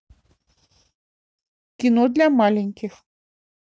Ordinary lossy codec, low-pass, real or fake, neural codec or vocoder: none; none; real; none